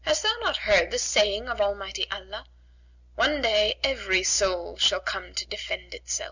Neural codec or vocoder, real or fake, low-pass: none; real; 7.2 kHz